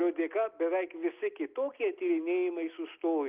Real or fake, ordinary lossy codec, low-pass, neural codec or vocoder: real; Opus, 24 kbps; 3.6 kHz; none